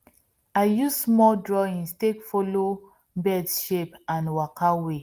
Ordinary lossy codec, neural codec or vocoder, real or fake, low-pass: Opus, 24 kbps; none; real; 14.4 kHz